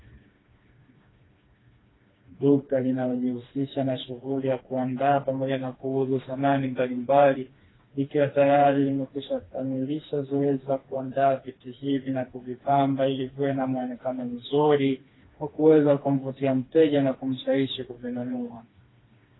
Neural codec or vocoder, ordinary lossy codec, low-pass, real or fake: codec, 16 kHz, 2 kbps, FreqCodec, smaller model; AAC, 16 kbps; 7.2 kHz; fake